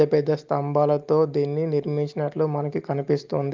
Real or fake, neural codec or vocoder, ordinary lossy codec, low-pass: real; none; Opus, 32 kbps; 7.2 kHz